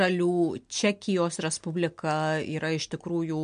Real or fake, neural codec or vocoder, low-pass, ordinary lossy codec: real; none; 9.9 kHz; MP3, 64 kbps